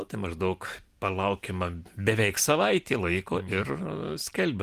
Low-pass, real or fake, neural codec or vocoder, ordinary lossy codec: 14.4 kHz; real; none; Opus, 24 kbps